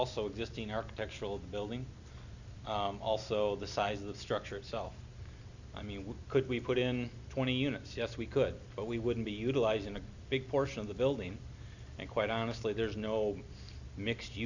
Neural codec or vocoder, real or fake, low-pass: none; real; 7.2 kHz